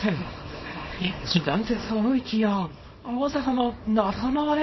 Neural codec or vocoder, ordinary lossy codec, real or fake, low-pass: codec, 24 kHz, 0.9 kbps, WavTokenizer, small release; MP3, 24 kbps; fake; 7.2 kHz